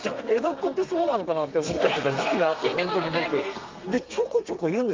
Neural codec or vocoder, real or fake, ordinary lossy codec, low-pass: codec, 32 kHz, 1.9 kbps, SNAC; fake; Opus, 24 kbps; 7.2 kHz